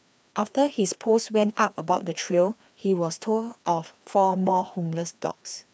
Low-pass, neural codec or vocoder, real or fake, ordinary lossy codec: none; codec, 16 kHz, 2 kbps, FreqCodec, larger model; fake; none